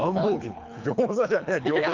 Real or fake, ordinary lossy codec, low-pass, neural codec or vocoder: fake; Opus, 24 kbps; 7.2 kHz; codec, 24 kHz, 3 kbps, HILCodec